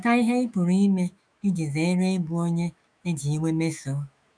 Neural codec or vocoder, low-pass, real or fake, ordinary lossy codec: autoencoder, 48 kHz, 128 numbers a frame, DAC-VAE, trained on Japanese speech; 9.9 kHz; fake; none